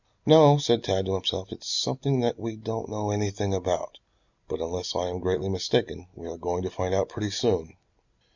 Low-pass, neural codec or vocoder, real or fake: 7.2 kHz; none; real